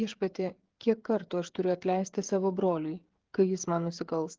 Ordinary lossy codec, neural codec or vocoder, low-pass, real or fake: Opus, 16 kbps; codec, 16 kHz, 16 kbps, FreqCodec, smaller model; 7.2 kHz; fake